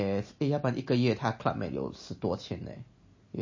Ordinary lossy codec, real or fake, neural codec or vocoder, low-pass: MP3, 32 kbps; real; none; 7.2 kHz